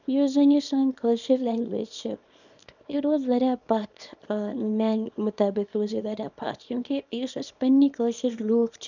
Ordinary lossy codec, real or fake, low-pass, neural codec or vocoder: none; fake; 7.2 kHz; codec, 24 kHz, 0.9 kbps, WavTokenizer, small release